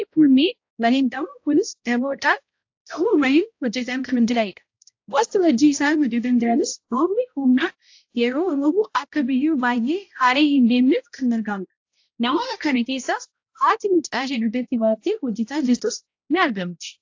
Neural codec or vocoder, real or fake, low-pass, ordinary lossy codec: codec, 16 kHz, 0.5 kbps, X-Codec, HuBERT features, trained on balanced general audio; fake; 7.2 kHz; AAC, 48 kbps